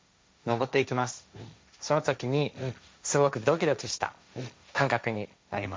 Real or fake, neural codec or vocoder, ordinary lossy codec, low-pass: fake; codec, 16 kHz, 1.1 kbps, Voila-Tokenizer; none; none